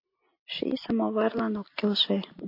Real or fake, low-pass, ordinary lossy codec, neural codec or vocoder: real; 5.4 kHz; MP3, 32 kbps; none